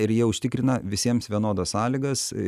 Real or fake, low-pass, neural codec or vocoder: real; 14.4 kHz; none